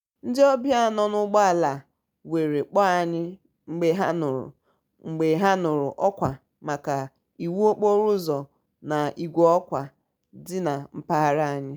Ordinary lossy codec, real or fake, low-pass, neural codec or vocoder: none; real; none; none